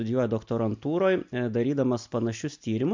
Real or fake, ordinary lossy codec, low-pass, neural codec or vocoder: real; MP3, 64 kbps; 7.2 kHz; none